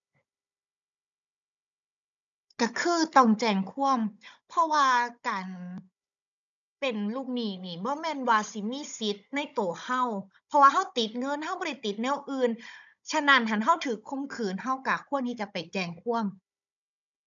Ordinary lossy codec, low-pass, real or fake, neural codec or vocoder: none; 7.2 kHz; fake; codec, 16 kHz, 16 kbps, FunCodec, trained on Chinese and English, 50 frames a second